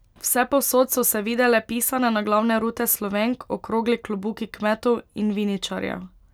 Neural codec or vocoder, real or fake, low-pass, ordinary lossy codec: none; real; none; none